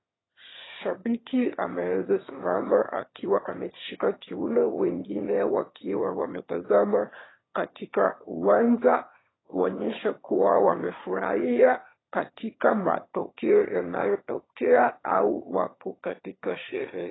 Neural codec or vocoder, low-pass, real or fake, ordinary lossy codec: autoencoder, 22.05 kHz, a latent of 192 numbers a frame, VITS, trained on one speaker; 7.2 kHz; fake; AAC, 16 kbps